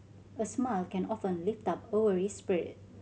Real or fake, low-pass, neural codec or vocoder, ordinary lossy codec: real; none; none; none